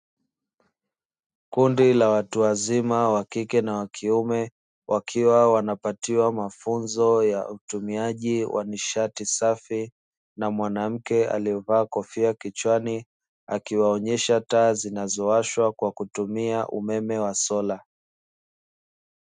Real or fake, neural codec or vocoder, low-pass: real; none; 9.9 kHz